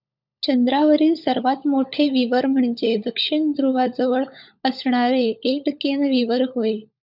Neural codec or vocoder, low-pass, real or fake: codec, 16 kHz, 16 kbps, FunCodec, trained on LibriTTS, 50 frames a second; 5.4 kHz; fake